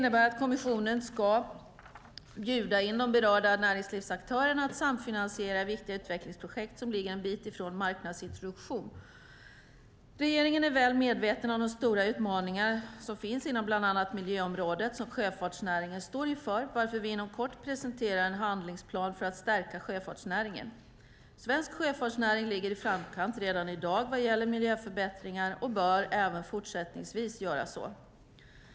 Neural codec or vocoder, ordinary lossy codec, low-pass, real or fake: none; none; none; real